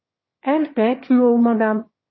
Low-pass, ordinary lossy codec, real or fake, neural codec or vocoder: 7.2 kHz; MP3, 24 kbps; fake; autoencoder, 22.05 kHz, a latent of 192 numbers a frame, VITS, trained on one speaker